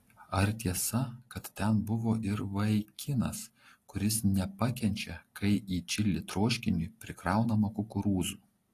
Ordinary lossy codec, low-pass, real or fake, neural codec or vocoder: AAC, 48 kbps; 14.4 kHz; real; none